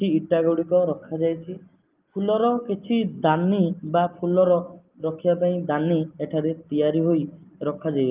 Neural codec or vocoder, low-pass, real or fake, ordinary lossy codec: none; 3.6 kHz; real; Opus, 24 kbps